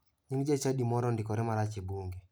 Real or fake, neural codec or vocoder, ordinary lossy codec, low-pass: real; none; none; none